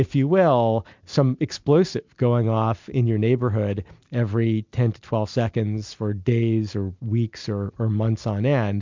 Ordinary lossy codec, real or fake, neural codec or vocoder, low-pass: MP3, 64 kbps; real; none; 7.2 kHz